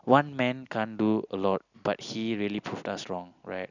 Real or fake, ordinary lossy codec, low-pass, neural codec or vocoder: real; none; 7.2 kHz; none